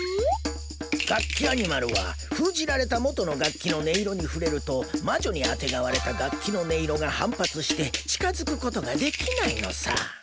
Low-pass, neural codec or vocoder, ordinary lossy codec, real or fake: none; none; none; real